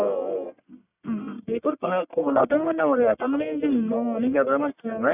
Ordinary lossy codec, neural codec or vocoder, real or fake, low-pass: none; codec, 44.1 kHz, 1.7 kbps, Pupu-Codec; fake; 3.6 kHz